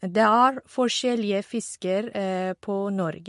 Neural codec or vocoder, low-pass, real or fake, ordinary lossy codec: none; 10.8 kHz; real; MP3, 48 kbps